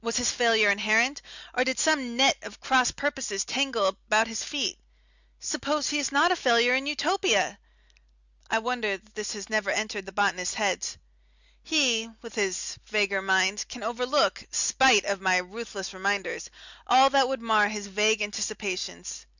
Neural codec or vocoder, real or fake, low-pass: none; real; 7.2 kHz